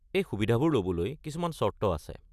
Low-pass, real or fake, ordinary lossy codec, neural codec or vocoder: 14.4 kHz; real; none; none